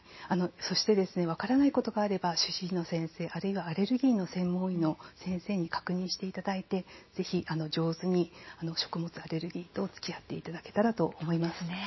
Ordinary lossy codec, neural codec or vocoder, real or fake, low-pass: MP3, 24 kbps; none; real; 7.2 kHz